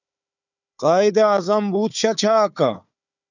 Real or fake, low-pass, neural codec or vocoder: fake; 7.2 kHz; codec, 16 kHz, 4 kbps, FunCodec, trained on Chinese and English, 50 frames a second